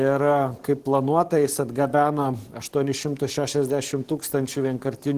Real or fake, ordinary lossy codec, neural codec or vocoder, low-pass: fake; Opus, 16 kbps; codec, 44.1 kHz, 7.8 kbps, DAC; 14.4 kHz